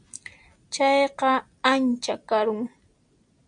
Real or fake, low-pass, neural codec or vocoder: real; 9.9 kHz; none